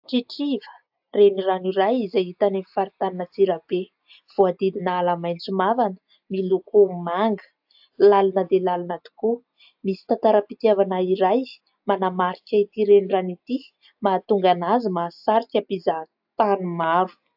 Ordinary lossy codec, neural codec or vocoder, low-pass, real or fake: AAC, 48 kbps; none; 5.4 kHz; real